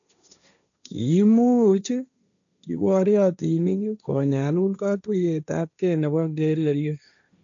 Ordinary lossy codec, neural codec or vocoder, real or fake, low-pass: none; codec, 16 kHz, 1.1 kbps, Voila-Tokenizer; fake; 7.2 kHz